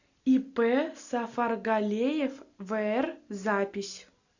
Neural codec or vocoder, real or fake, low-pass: none; real; 7.2 kHz